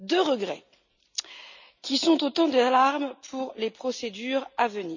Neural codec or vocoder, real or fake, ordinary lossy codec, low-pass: none; real; none; 7.2 kHz